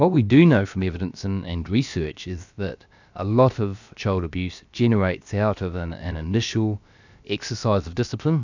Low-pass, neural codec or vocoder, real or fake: 7.2 kHz; codec, 16 kHz, about 1 kbps, DyCAST, with the encoder's durations; fake